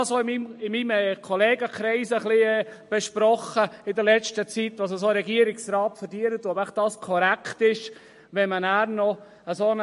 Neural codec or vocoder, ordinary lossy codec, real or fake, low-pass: none; MP3, 48 kbps; real; 14.4 kHz